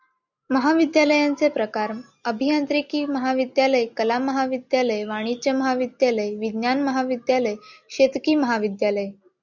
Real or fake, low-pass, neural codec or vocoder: real; 7.2 kHz; none